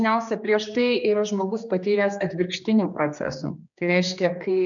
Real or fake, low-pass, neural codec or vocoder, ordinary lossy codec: fake; 7.2 kHz; codec, 16 kHz, 2 kbps, X-Codec, HuBERT features, trained on general audio; MP3, 64 kbps